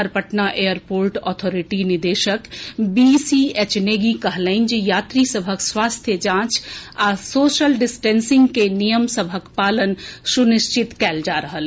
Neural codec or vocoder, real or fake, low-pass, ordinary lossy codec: none; real; none; none